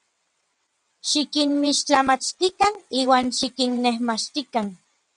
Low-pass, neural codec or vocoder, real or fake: 9.9 kHz; vocoder, 22.05 kHz, 80 mel bands, WaveNeXt; fake